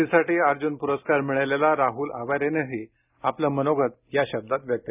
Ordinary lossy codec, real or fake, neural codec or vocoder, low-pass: none; real; none; 3.6 kHz